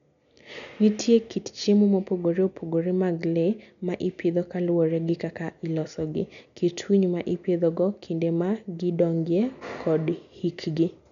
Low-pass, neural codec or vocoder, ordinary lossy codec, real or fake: 7.2 kHz; none; none; real